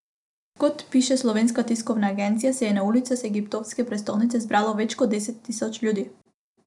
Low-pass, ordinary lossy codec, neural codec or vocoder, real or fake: 10.8 kHz; none; none; real